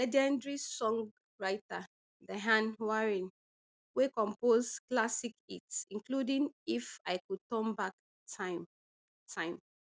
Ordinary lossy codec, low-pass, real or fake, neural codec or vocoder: none; none; real; none